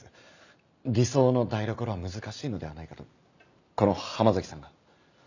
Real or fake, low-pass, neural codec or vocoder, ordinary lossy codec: real; 7.2 kHz; none; none